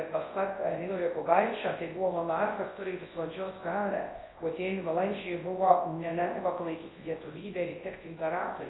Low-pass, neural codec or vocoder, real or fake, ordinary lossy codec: 7.2 kHz; codec, 24 kHz, 0.9 kbps, WavTokenizer, large speech release; fake; AAC, 16 kbps